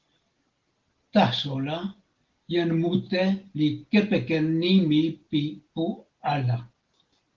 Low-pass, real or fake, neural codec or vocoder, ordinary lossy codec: 7.2 kHz; real; none; Opus, 16 kbps